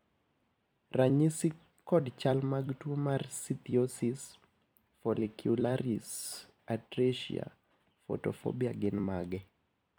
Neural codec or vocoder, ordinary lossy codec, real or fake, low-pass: vocoder, 44.1 kHz, 128 mel bands every 256 samples, BigVGAN v2; none; fake; none